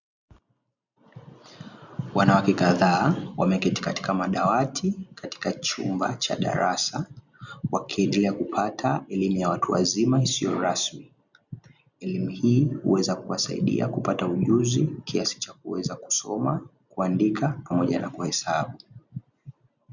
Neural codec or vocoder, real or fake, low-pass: none; real; 7.2 kHz